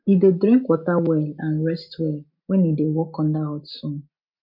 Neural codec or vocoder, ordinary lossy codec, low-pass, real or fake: none; MP3, 48 kbps; 5.4 kHz; real